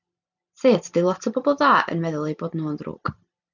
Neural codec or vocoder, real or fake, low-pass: vocoder, 44.1 kHz, 128 mel bands every 256 samples, BigVGAN v2; fake; 7.2 kHz